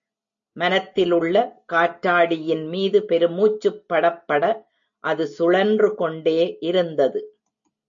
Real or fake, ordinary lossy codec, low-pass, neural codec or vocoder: real; AAC, 64 kbps; 7.2 kHz; none